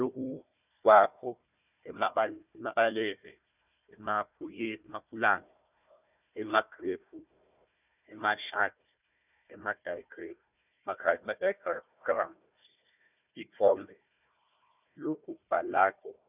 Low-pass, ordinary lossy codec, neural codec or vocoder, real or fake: 3.6 kHz; none; codec, 16 kHz, 1 kbps, FunCodec, trained on Chinese and English, 50 frames a second; fake